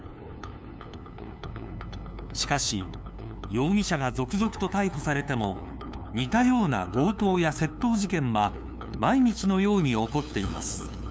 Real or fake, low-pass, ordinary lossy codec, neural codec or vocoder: fake; none; none; codec, 16 kHz, 2 kbps, FunCodec, trained on LibriTTS, 25 frames a second